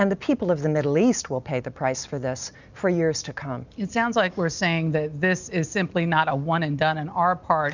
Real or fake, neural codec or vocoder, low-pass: real; none; 7.2 kHz